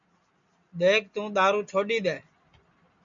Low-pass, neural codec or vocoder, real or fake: 7.2 kHz; none; real